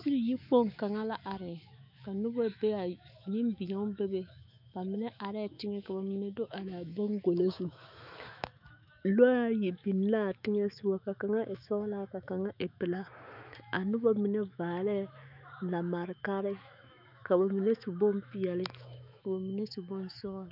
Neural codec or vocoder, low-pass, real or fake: autoencoder, 48 kHz, 128 numbers a frame, DAC-VAE, trained on Japanese speech; 5.4 kHz; fake